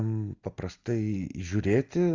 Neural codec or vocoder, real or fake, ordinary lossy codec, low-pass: none; real; Opus, 32 kbps; 7.2 kHz